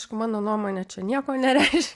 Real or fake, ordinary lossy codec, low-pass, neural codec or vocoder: real; Opus, 64 kbps; 10.8 kHz; none